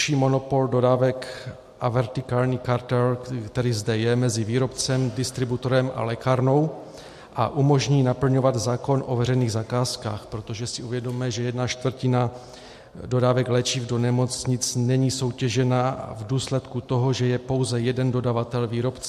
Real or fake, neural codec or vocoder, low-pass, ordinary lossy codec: real; none; 14.4 kHz; MP3, 64 kbps